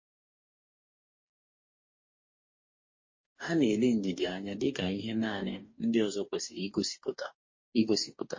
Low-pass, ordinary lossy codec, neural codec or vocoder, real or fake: 7.2 kHz; MP3, 32 kbps; codec, 44.1 kHz, 2.6 kbps, DAC; fake